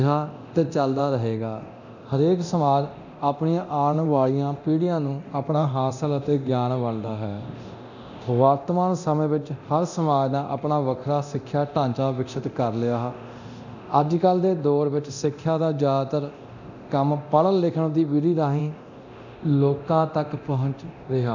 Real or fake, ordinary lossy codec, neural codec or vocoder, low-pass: fake; none; codec, 24 kHz, 0.9 kbps, DualCodec; 7.2 kHz